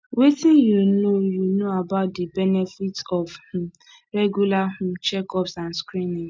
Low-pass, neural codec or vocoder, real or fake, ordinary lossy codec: none; none; real; none